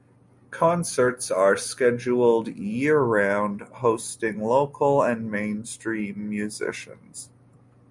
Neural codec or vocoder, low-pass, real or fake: none; 10.8 kHz; real